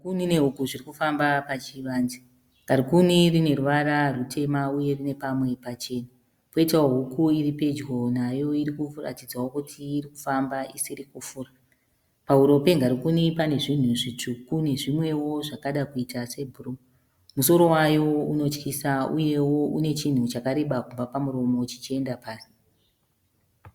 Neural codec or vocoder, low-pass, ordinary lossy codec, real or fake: none; 19.8 kHz; Opus, 64 kbps; real